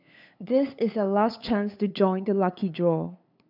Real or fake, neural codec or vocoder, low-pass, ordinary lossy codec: fake; codec, 16 kHz, 8 kbps, FunCodec, trained on LibriTTS, 25 frames a second; 5.4 kHz; none